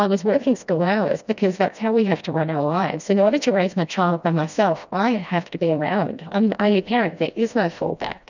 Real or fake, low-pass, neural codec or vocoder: fake; 7.2 kHz; codec, 16 kHz, 1 kbps, FreqCodec, smaller model